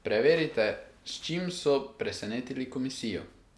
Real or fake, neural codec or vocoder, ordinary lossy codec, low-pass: real; none; none; none